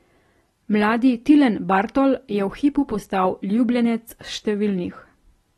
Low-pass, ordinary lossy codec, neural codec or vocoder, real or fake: 19.8 kHz; AAC, 32 kbps; none; real